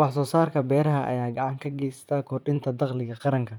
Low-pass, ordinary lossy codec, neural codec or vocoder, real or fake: 19.8 kHz; none; vocoder, 44.1 kHz, 128 mel bands, Pupu-Vocoder; fake